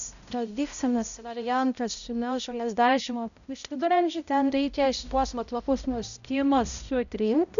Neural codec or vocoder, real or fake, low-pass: codec, 16 kHz, 0.5 kbps, X-Codec, HuBERT features, trained on balanced general audio; fake; 7.2 kHz